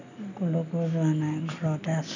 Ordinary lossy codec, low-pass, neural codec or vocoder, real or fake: none; 7.2 kHz; none; real